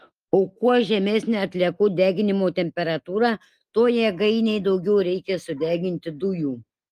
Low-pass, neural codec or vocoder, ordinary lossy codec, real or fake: 14.4 kHz; none; Opus, 32 kbps; real